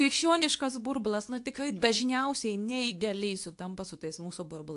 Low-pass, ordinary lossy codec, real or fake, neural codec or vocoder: 10.8 kHz; AAC, 64 kbps; fake; codec, 24 kHz, 0.9 kbps, WavTokenizer, medium speech release version 2